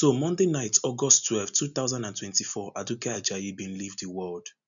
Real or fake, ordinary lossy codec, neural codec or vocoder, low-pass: real; none; none; 7.2 kHz